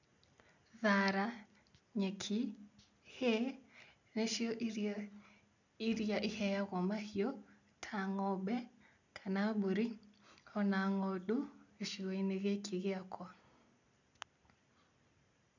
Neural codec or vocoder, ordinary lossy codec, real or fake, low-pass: none; none; real; 7.2 kHz